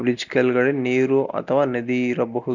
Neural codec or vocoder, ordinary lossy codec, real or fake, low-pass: none; none; real; 7.2 kHz